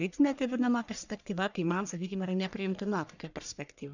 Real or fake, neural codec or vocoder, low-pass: fake; codec, 44.1 kHz, 1.7 kbps, Pupu-Codec; 7.2 kHz